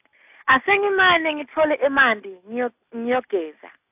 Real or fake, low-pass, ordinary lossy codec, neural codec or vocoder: real; 3.6 kHz; AAC, 32 kbps; none